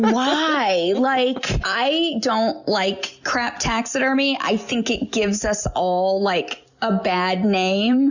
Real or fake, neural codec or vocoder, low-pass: real; none; 7.2 kHz